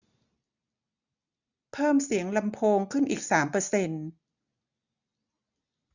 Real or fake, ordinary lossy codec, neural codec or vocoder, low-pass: real; none; none; 7.2 kHz